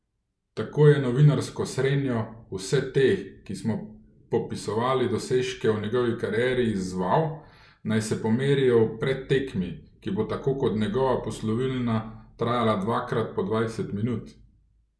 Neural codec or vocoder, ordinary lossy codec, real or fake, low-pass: none; none; real; none